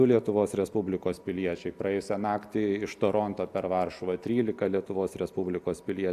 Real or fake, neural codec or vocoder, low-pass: real; none; 14.4 kHz